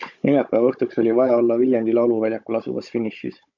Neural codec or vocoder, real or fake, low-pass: codec, 16 kHz, 16 kbps, FunCodec, trained on Chinese and English, 50 frames a second; fake; 7.2 kHz